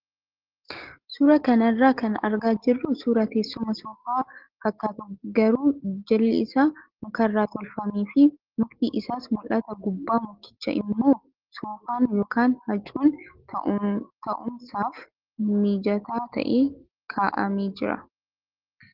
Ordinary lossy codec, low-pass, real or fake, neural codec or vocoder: Opus, 16 kbps; 5.4 kHz; real; none